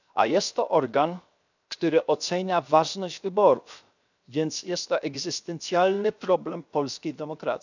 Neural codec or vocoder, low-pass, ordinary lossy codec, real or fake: codec, 16 kHz, 0.7 kbps, FocalCodec; 7.2 kHz; none; fake